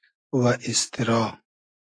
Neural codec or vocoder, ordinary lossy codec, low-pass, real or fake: none; AAC, 32 kbps; 9.9 kHz; real